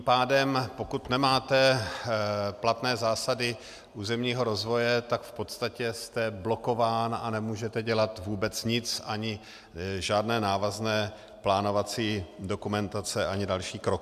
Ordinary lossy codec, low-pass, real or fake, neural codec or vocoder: MP3, 96 kbps; 14.4 kHz; real; none